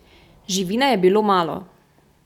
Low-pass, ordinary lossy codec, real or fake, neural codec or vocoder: 19.8 kHz; none; real; none